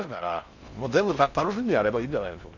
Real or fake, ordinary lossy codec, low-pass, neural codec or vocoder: fake; none; 7.2 kHz; codec, 16 kHz in and 24 kHz out, 0.6 kbps, FocalCodec, streaming, 4096 codes